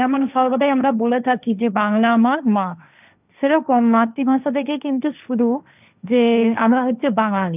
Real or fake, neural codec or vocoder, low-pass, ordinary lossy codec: fake; codec, 16 kHz, 1.1 kbps, Voila-Tokenizer; 3.6 kHz; none